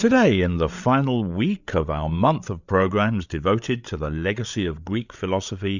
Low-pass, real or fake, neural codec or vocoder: 7.2 kHz; fake; codec, 16 kHz, 8 kbps, FreqCodec, larger model